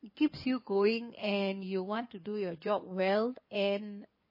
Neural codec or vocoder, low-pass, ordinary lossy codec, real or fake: codec, 16 kHz in and 24 kHz out, 2.2 kbps, FireRedTTS-2 codec; 5.4 kHz; MP3, 24 kbps; fake